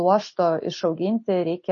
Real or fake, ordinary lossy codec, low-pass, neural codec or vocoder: real; MP3, 32 kbps; 7.2 kHz; none